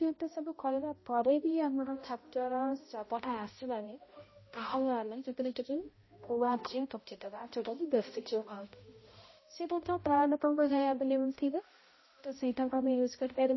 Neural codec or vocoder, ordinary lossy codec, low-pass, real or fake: codec, 16 kHz, 0.5 kbps, X-Codec, HuBERT features, trained on balanced general audio; MP3, 24 kbps; 7.2 kHz; fake